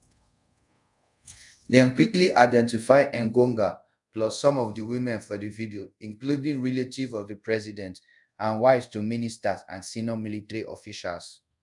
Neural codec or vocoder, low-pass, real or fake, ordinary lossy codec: codec, 24 kHz, 0.5 kbps, DualCodec; 10.8 kHz; fake; none